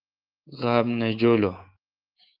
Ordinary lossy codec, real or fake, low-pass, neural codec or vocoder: Opus, 32 kbps; fake; 5.4 kHz; codec, 24 kHz, 3.1 kbps, DualCodec